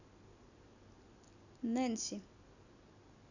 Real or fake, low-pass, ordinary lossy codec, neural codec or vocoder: real; 7.2 kHz; none; none